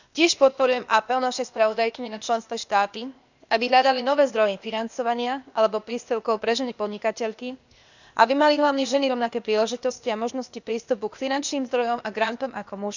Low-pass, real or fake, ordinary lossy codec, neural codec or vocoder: 7.2 kHz; fake; none; codec, 16 kHz, 0.8 kbps, ZipCodec